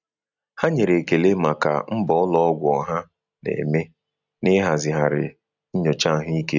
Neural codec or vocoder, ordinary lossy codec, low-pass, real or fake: none; none; 7.2 kHz; real